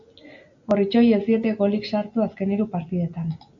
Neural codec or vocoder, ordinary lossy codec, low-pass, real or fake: none; AAC, 64 kbps; 7.2 kHz; real